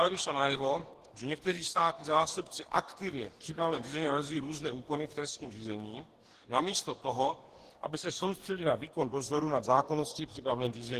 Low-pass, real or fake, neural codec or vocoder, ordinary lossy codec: 14.4 kHz; fake; codec, 44.1 kHz, 2.6 kbps, DAC; Opus, 16 kbps